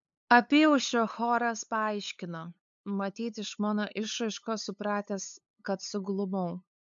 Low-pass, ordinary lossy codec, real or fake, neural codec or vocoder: 7.2 kHz; MP3, 64 kbps; fake; codec, 16 kHz, 8 kbps, FunCodec, trained on LibriTTS, 25 frames a second